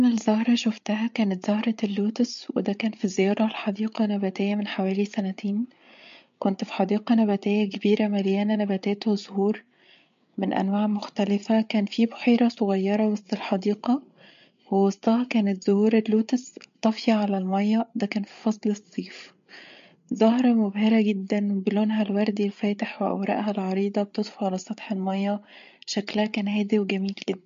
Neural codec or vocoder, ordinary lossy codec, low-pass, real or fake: codec, 16 kHz, 8 kbps, FreqCodec, larger model; MP3, 48 kbps; 7.2 kHz; fake